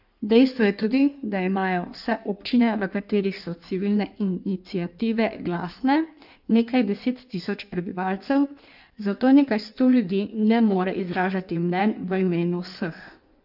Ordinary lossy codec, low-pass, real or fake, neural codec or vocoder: none; 5.4 kHz; fake; codec, 16 kHz in and 24 kHz out, 1.1 kbps, FireRedTTS-2 codec